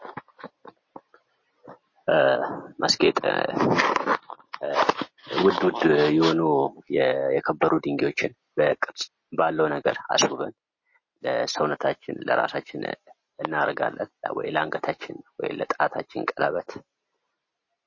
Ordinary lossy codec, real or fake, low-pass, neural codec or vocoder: MP3, 32 kbps; real; 7.2 kHz; none